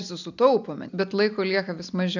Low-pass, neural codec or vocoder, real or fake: 7.2 kHz; none; real